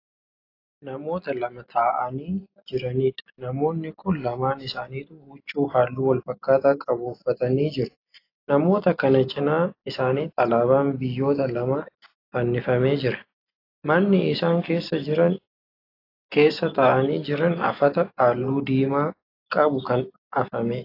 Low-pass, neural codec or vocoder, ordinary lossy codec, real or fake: 5.4 kHz; none; AAC, 32 kbps; real